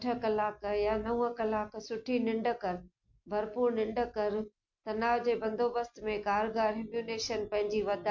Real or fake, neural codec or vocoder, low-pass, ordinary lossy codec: real; none; 7.2 kHz; MP3, 48 kbps